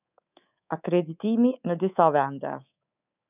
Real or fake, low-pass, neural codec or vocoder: fake; 3.6 kHz; codec, 24 kHz, 3.1 kbps, DualCodec